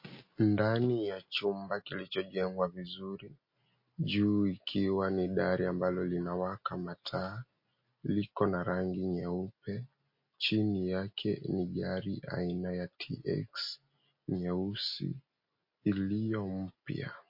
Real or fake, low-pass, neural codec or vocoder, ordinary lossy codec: real; 5.4 kHz; none; MP3, 32 kbps